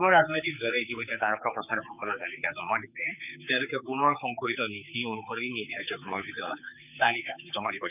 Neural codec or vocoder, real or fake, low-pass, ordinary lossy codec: codec, 16 kHz, 4 kbps, X-Codec, HuBERT features, trained on general audio; fake; 3.6 kHz; none